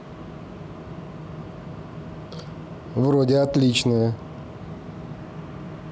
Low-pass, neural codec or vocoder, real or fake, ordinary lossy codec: none; none; real; none